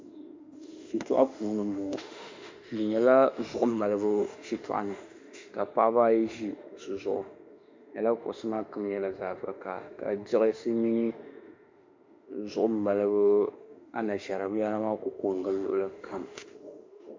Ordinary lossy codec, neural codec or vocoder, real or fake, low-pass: MP3, 48 kbps; autoencoder, 48 kHz, 32 numbers a frame, DAC-VAE, trained on Japanese speech; fake; 7.2 kHz